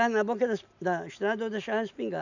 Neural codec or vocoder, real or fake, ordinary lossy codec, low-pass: none; real; none; 7.2 kHz